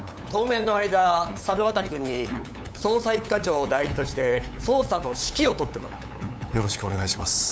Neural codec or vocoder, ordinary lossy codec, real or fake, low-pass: codec, 16 kHz, 8 kbps, FunCodec, trained on LibriTTS, 25 frames a second; none; fake; none